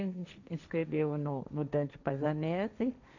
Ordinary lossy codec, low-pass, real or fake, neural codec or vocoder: none; 7.2 kHz; fake; codec, 16 kHz, 1.1 kbps, Voila-Tokenizer